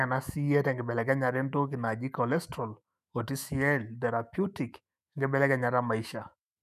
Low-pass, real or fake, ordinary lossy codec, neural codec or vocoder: 14.4 kHz; fake; none; autoencoder, 48 kHz, 128 numbers a frame, DAC-VAE, trained on Japanese speech